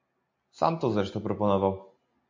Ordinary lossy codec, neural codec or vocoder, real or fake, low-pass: MP3, 48 kbps; none; real; 7.2 kHz